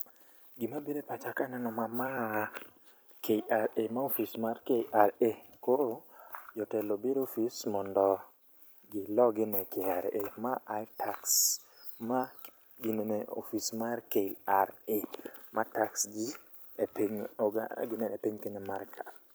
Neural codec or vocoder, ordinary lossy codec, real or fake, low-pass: vocoder, 44.1 kHz, 128 mel bands every 256 samples, BigVGAN v2; none; fake; none